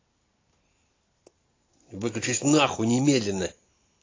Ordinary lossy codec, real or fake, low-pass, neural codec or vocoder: AAC, 32 kbps; real; 7.2 kHz; none